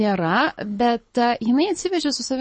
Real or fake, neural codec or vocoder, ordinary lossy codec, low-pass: fake; codec, 24 kHz, 6 kbps, HILCodec; MP3, 32 kbps; 9.9 kHz